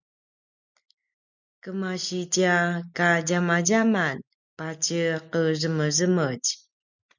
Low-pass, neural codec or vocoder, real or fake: 7.2 kHz; none; real